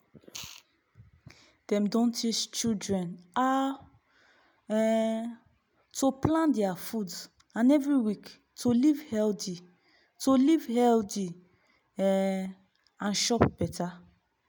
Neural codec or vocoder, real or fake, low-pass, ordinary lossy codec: none; real; none; none